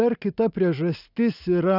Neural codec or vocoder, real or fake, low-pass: none; real; 5.4 kHz